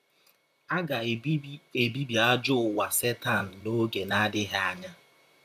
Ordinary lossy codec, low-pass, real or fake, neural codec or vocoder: none; 14.4 kHz; fake; vocoder, 44.1 kHz, 128 mel bands, Pupu-Vocoder